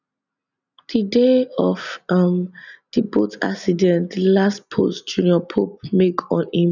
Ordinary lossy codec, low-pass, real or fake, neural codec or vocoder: none; 7.2 kHz; real; none